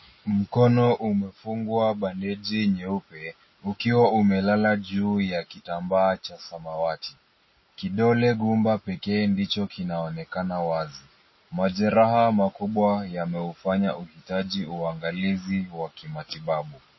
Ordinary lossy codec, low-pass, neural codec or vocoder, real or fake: MP3, 24 kbps; 7.2 kHz; none; real